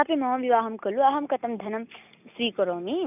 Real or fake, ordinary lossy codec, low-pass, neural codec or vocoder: real; none; 3.6 kHz; none